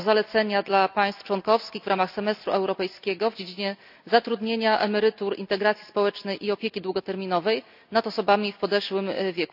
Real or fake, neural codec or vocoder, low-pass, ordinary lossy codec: real; none; 5.4 kHz; none